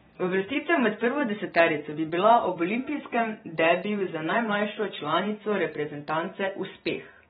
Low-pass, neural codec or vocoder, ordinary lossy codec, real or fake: 19.8 kHz; vocoder, 44.1 kHz, 128 mel bands every 512 samples, BigVGAN v2; AAC, 16 kbps; fake